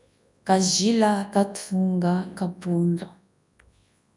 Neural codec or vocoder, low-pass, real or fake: codec, 24 kHz, 0.9 kbps, WavTokenizer, large speech release; 10.8 kHz; fake